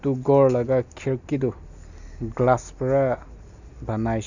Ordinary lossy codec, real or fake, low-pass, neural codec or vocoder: none; real; 7.2 kHz; none